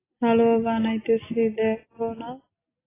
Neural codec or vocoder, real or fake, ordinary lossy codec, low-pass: none; real; AAC, 16 kbps; 3.6 kHz